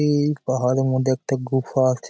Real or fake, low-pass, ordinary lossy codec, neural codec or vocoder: real; none; none; none